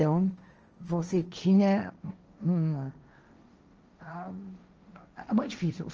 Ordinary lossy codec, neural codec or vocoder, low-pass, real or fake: Opus, 24 kbps; codec, 16 kHz, 1.1 kbps, Voila-Tokenizer; 7.2 kHz; fake